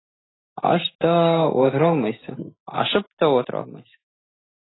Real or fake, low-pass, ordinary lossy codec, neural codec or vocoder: real; 7.2 kHz; AAC, 16 kbps; none